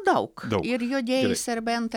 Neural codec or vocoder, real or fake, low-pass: none; real; 19.8 kHz